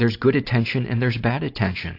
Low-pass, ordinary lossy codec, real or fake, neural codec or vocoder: 5.4 kHz; AAC, 32 kbps; real; none